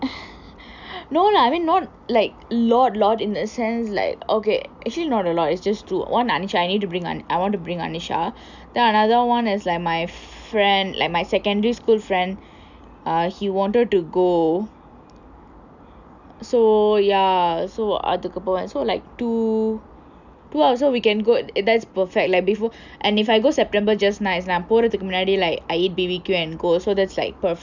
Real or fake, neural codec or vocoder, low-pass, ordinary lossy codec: real; none; 7.2 kHz; none